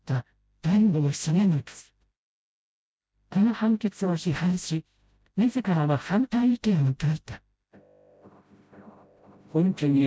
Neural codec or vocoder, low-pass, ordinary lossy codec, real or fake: codec, 16 kHz, 0.5 kbps, FreqCodec, smaller model; none; none; fake